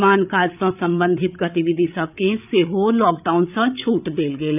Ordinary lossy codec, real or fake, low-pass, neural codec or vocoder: none; fake; 3.6 kHz; codec, 16 kHz, 8 kbps, FreqCodec, larger model